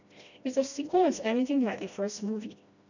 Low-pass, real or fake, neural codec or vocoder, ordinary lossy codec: 7.2 kHz; fake; codec, 16 kHz, 1 kbps, FreqCodec, smaller model; none